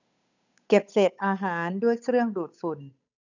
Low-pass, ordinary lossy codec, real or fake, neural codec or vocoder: 7.2 kHz; none; fake; codec, 16 kHz, 8 kbps, FunCodec, trained on Chinese and English, 25 frames a second